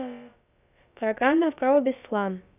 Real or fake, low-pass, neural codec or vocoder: fake; 3.6 kHz; codec, 16 kHz, about 1 kbps, DyCAST, with the encoder's durations